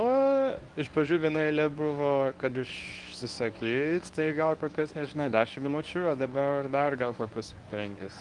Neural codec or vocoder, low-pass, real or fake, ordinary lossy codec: codec, 24 kHz, 0.9 kbps, WavTokenizer, medium speech release version 1; 10.8 kHz; fake; Opus, 32 kbps